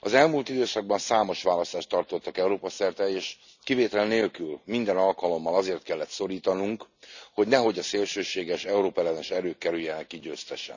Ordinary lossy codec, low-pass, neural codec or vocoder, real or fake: none; 7.2 kHz; none; real